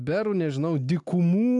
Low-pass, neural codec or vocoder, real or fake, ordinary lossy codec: 10.8 kHz; none; real; AAC, 64 kbps